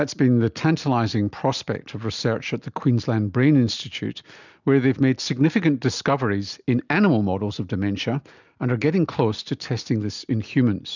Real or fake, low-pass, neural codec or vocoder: real; 7.2 kHz; none